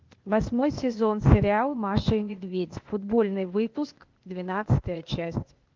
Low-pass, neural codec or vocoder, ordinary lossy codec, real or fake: 7.2 kHz; codec, 16 kHz, 0.8 kbps, ZipCodec; Opus, 32 kbps; fake